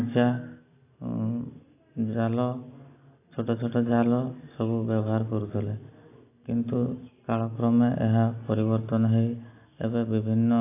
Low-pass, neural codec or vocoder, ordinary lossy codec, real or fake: 3.6 kHz; none; AAC, 24 kbps; real